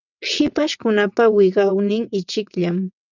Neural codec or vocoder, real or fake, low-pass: vocoder, 22.05 kHz, 80 mel bands, WaveNeXt; fake; 7.2 kHz